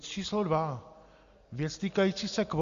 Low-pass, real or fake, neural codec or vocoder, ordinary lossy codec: 7.2 kHz; real; none; Opus, 64 kbps